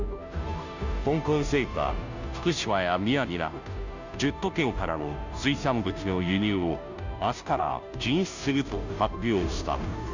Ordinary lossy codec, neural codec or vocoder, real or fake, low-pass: none; codec, 16 kHz, 0.5 kbps, FunCodec, trained on Chinese and English, 25 frames a second; fake; 7.2 kHz